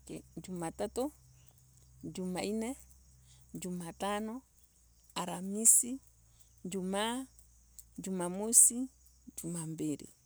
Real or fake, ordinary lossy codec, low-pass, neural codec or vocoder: real; none; none; none